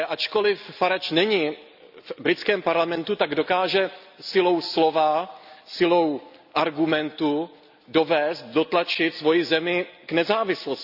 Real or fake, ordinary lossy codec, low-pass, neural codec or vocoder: real; none; 5.4 kHz; none